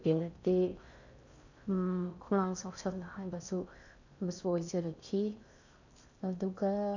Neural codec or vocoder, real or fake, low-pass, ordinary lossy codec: codec, 16 kHz in and 24 kHz out, 0.6 kbps, FocalCodec, streaming, 4096 codes; fake; 7.2 kHz; none